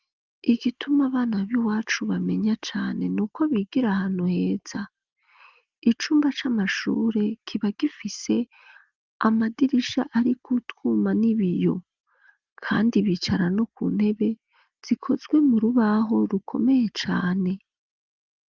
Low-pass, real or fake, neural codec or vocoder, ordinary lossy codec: 7.2 kHz; real; none; Opus, 32 kbps